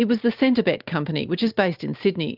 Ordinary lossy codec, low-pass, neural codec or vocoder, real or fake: Opus, 24 kbps; 5.4 kHz; none; real